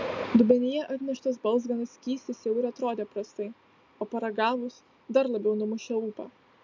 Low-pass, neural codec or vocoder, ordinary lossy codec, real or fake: 7.2 kHz; none; MP3, 64 kbps; real